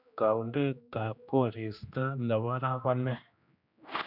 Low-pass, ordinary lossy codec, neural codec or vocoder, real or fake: 5.4 kHz; none; codec, 16 kHz, 1 kbps, X-Codec, HuBERT features, trained on general audio; fake